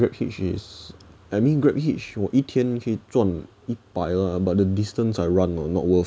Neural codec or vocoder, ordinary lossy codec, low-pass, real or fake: none; none; none; real